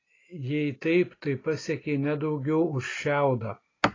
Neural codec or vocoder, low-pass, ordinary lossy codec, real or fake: none; 7.2 kHz; AAC, 32 kbps; real